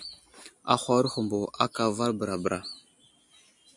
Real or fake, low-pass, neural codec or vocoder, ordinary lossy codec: real; 10.8 kHz; none; MP3, 64 kbps